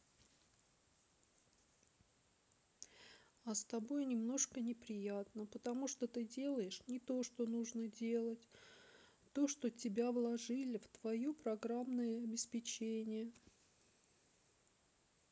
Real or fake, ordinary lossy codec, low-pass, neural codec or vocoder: real; none; none; none